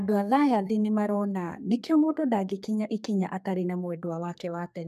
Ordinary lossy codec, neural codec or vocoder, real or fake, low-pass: none; codec, 32 kHz, 1.9 kbps, SNAC; fake; 14.4 kHz